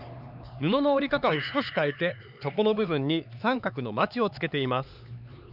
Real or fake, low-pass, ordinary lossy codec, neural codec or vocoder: fake; 5.4 kHz; none; codec, 16 kHz, 4 kbps, X-Codec, HuBERT features, trained on LibriSpeech